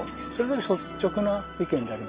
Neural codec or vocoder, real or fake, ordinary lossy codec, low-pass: none; real; Opus, 32 kbps; 3.6 kHz